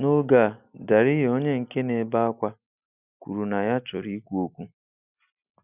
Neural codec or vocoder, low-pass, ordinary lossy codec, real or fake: none; 3.6 kHz; none; real